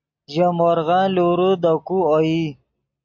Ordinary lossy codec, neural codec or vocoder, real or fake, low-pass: MP3, 48 kbps; none; real; 7.2 kHz